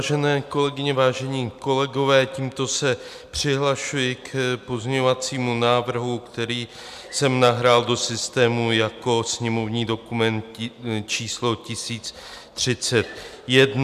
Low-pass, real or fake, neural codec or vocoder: 14.4 kHz; real; none